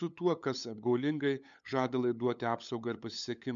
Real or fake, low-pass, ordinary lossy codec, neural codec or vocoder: fake; 7.2 kHz; MP3, 96 kbps; codec, 16 kHz, 8 kbps, FunCodec, trained on LibriTTS, 25 frames a second